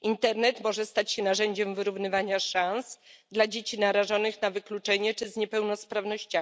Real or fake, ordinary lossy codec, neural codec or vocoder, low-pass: real; none; none; none